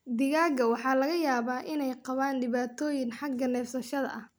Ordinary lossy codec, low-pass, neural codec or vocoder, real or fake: none; none; none; real